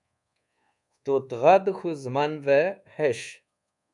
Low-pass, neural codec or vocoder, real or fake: 10.8 kHz; codec, 24 kHz, 1.2 kbps, DualCodec; fake